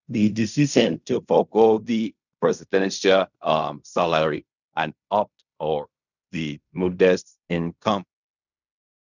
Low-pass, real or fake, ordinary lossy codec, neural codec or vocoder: 7.2 kHz; fake; none; codec, 16 kHz in and 24 kHz out, 0.4 kbps, LongCat-Audio-Codec, fine tuned four codebook decoder